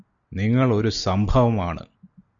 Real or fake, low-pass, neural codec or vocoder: real; 7.2 kHz; none